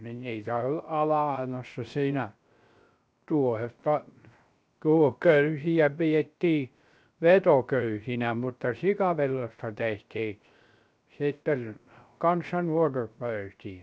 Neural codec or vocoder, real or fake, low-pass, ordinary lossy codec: codec, 16 kHz, 0.3 kbps, FocalCodec; fake; none; none